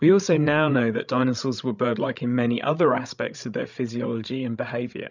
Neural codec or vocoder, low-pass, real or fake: codec, 16 kHz, 16 kbps, FreqCodec, larger model; 7.2 kHz; fake